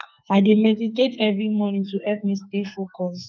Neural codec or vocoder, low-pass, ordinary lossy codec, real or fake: codec, 44.1 kHz, 2.6 kbps, SNAC; 7.2 kHz; none; fake